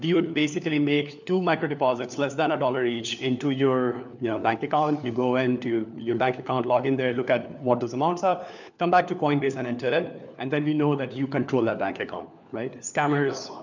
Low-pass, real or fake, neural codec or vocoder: 7.2 kHz; fake; codec, 16 kHz, 4 kbps, FunCodec, trained on LibriTTS, 50 frames a second